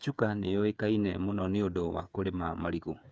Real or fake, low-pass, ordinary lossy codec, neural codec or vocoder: fake; none; none; codec, 16 kHz, 8 kbps, FreqCodec, smaller model